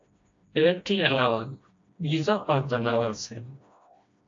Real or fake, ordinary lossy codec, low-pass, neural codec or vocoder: fake; AAC, 48 kbps; 7.2 kHz; codec, 16 kHz, 1 kbps, FreqCodec, smaller model